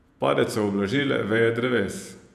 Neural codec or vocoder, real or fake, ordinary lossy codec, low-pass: vocoder, 48 kHz, 128 mel bands, Vocos; fake; none; 14.4 kHz